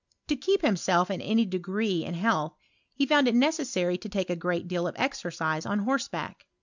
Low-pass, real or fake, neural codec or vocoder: 7.2 kHz; real; none